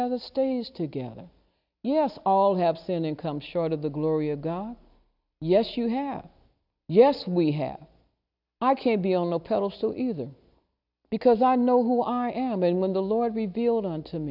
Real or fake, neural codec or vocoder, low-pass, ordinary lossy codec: real; none; 5.4 kHz; AAC, 48 kbps